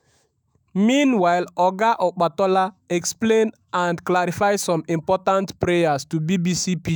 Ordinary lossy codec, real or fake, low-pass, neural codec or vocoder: none; fake; none; autoencoder, 48 kHz, 128 numbers a frame, DAC-VAE, trained on Japanese speech